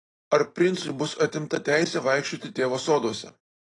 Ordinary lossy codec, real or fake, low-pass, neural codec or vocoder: AAC, 32 kbps; real; 10.8 kHz; none